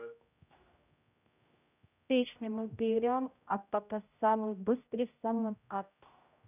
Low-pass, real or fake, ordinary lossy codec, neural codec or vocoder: 3.6 kHz; fake; none; codec, 16 kHz, 0.5 kbps, X-Codec, HuBERT features, trained on general audio